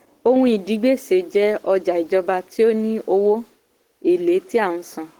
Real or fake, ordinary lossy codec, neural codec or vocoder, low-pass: fake; Opus, 16 kbps; codec, 44.1 kHz, 7.8 kbps, Pupu-Codec; 19.8 kHz